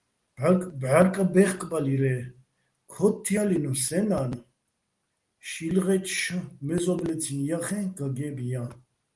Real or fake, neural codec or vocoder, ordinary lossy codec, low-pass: real; none; Opus, 24 kbps; 10.8 kHz